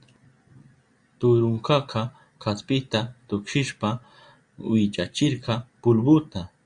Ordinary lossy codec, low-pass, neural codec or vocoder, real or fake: Opus, 64 kbps; 9.9 kHz; none; real